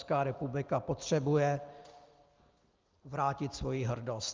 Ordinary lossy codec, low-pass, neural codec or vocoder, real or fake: Opus, 32 kbps; 7.2 kHz; none; real